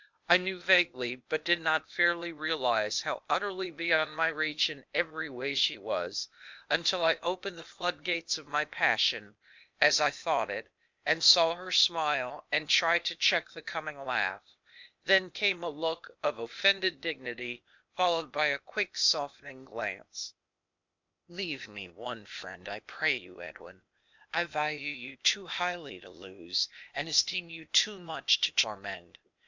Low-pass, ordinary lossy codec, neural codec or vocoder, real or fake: 7.2 kHz; MP3, 64 kbps; codec, 16 kHz, 0.8 kbps, ZipCodec; fake